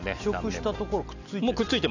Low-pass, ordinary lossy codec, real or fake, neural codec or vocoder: 7.2 kHz; none; real; none